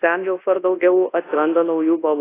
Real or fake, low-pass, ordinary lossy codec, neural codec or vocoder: fake; 3.6 kHz; AAC, 16 kbps; codec, 24 kHz, 0.9 kbps, WavTokenizer, large speech release